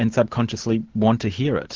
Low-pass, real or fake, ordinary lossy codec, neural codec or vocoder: 7.2 kHz; real; Opus, 16 kbps; none